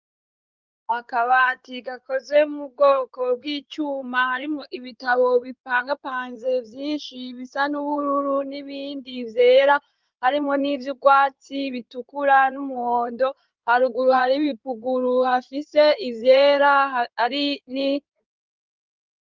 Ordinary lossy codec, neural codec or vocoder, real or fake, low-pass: Opus, 24 kbps; codec, 16 kHz in and 24 kHz out, 2.2 kbps, FireRedTTS-2 codec; fake; 7.2 kHz